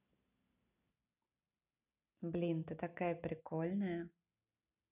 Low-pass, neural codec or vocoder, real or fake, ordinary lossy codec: 3.6 kHz; none; real; none